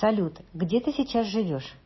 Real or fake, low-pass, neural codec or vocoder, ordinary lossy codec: real; 7.2 kHz; none; MP3, 24 kbps